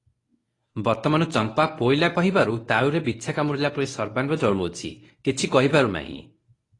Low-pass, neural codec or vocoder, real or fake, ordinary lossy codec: 10.8 kHz; codec, 24 kHz, 0.9 kbps, WavTokenizer, medium speech release version 1; fake; AAC, 32 kbps